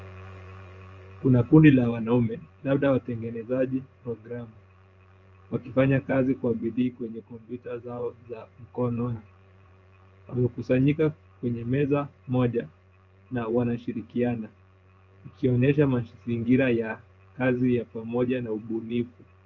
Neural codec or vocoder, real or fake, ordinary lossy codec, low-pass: vocoder, 24 kHz, 100 mel bands, Vocos; fake; Opus, 32 kbps; 7.2 kHz